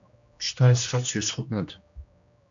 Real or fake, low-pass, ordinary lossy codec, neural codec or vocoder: fake; 7.2 kHz; AAC, 64 kbps; codec, 16 kHz, 1 kbps, X-Codec, HuBERT features, trained on general audio